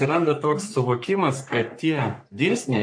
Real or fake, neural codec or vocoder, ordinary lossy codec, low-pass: fake; codec, 44.1 kHz, 3.4 kbps, Pupu-Codec; AAC, 64 kbps; 9.9 kHz